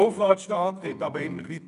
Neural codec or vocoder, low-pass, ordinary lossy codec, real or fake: codec, 24 kHz, 0.9 kbps, WavTokenizer, medium music audio release; 10.8 kHz; none; fake